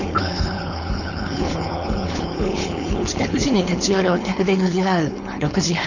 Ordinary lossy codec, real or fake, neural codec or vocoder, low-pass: none; fake; codec, 16 kHz, 4.8 kbps, FACodec; 7.2 kHz